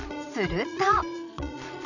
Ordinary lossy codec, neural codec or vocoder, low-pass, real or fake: none; vocoder, 22.05 kHz, 80 mel bands, Vocos; 7.2 kHz; fake